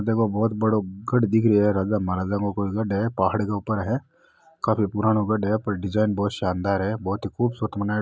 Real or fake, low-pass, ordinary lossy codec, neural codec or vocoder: real; none; none; none